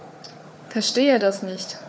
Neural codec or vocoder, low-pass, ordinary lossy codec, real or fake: codec, 16 kHz, 4 kbps, FunCodec, trained on Chinese and English, 50 frames a second; none; none; fake